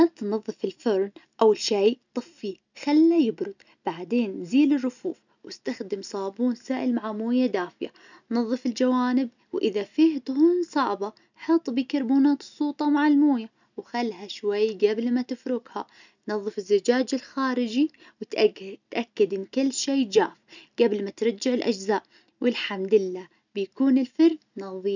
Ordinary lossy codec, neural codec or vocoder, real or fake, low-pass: none; none; real; 7.2 kHz